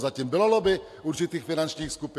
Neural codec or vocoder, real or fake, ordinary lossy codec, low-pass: none; real; AAC, 64 kbps; 14.4 kHz